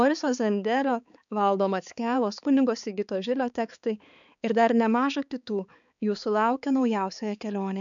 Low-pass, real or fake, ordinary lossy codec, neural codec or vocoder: 7.2 kHz; fake; MP3, 96 kbps; codec, 16 kHz, 4 kbps, X-Codec, HuBERT features, trained on balanced general audio